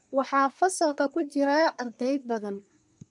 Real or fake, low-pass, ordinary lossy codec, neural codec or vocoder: fake; 10.8 kHz; none; codec, 24 kHz, 1 kbps, SNAC